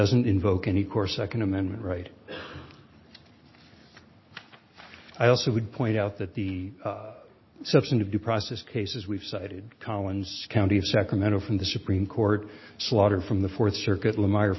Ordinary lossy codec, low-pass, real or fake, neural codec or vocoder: MP3, 24 kbps; 7.2 kHz; real; none